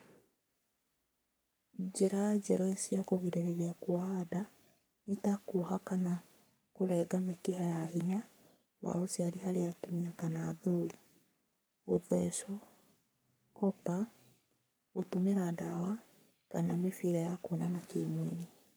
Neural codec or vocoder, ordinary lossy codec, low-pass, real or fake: codec, 44.1 kHz, 3.4 kbps, Pupu-Codec; none; none; fake